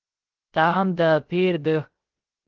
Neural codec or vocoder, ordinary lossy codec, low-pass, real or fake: codec, 16 kHz, 0.3 kbps, FocalCodec; Opus, 16 kbps; 7.2 kHz; fake